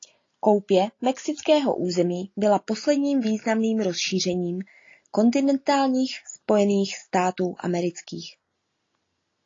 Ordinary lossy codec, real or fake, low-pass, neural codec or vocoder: AAC, 32 kbps; real; 7.2 kHz; none